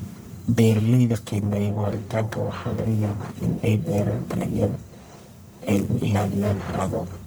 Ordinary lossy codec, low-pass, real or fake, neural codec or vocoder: none; none; fake; codec, 44.1 kHz, 1.7 kbps, Pupu-Codec